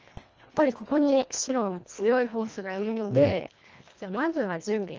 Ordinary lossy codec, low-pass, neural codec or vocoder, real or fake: Opus, 24 kbps; 7.2 kHz; codec, 24 kHz, 1.5 kbps, HILCodec; fake